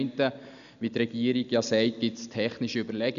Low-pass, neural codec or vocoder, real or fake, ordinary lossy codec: 7.2 kHz; none; real; none